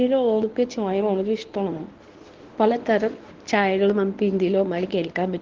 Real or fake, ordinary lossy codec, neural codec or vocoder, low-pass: fake; Opus, 16 kbps; codec, 24 kHz, 0.9 kbps, WavTokenizer, medium speech release version 1; 7.2 kHz